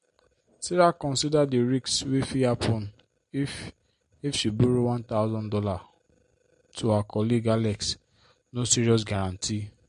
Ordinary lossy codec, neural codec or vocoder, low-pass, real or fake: MP3, 48 kbps; none; 10.8 kHz; real